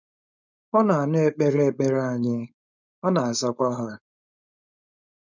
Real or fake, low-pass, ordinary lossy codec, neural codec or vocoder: fake; 7.2 kHz; none; codec, 16 kHz, 4.8 kbps, FACodec